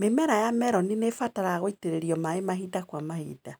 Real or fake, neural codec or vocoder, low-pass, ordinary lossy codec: real; none; none; none